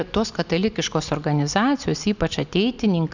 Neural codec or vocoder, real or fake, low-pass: none; real; 7.2 kHz